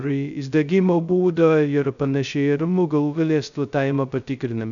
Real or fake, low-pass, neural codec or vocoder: fake; 7.2 kHz; codec, 16 kHz, 0.2 kbps, FocalCodec